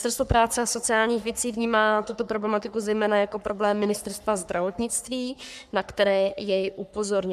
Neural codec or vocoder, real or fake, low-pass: codec, 44.1 kHz, 3.4 kbps, Pupu-Codec; fake; 14.4 kHz